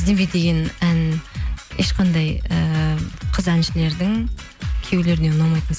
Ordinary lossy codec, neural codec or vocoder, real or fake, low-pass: none; none; real; none